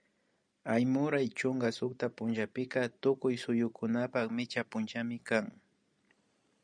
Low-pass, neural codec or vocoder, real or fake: 9.9 kHz; none; real